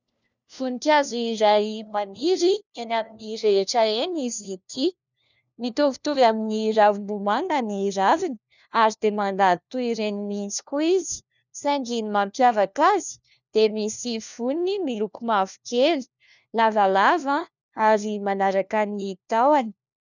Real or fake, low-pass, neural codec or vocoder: fake; 7.2 kHz; codec, 16 kHz, 1 kbps, FunCodec, trained on LibriTTS, 50 frames a second